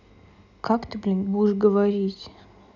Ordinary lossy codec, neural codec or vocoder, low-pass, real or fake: none; none; 7.2 kHz; real